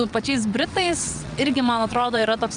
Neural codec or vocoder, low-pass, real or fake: vocoder, 22.05 kHz, 80 mel bands, WaveNeXt; 9.9 kHz; fake